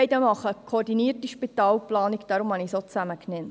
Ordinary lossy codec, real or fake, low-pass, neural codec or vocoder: none; real; none; none